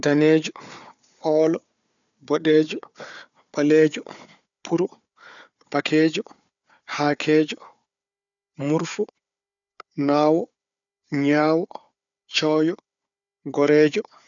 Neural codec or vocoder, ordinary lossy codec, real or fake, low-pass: codec, 16 kHz, 4 kbps, FunCodec, trained on Chinese and English, 50 frames a second; none; fake; 7.2 kHz